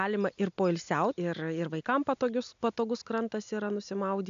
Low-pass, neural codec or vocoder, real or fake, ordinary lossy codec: 7.2 kHz; none; real; AAC, 96 kbps